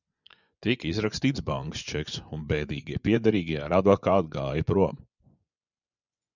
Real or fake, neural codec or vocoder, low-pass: fake; vocoder, 24 kHz, 100 mel bands, Vocos; 7.2 kHz